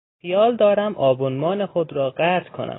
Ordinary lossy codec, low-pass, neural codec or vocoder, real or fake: AAC, 16 kbps; 7.2 kHz; none; real